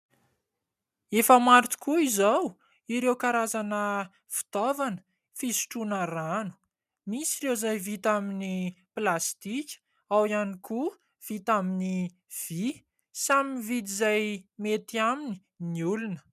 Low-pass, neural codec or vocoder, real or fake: 14.4 kHz; none; real